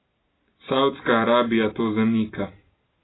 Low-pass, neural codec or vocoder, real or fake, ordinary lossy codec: 7.2 kHz; none; real; AAC, 16 kbps